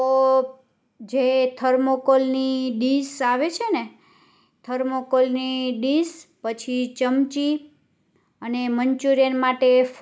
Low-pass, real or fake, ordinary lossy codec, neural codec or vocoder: none; real; none; none